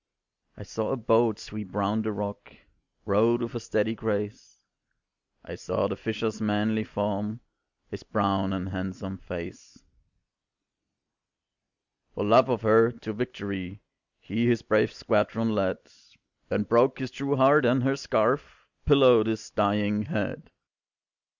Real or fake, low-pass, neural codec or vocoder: real; 7.2 kHz; none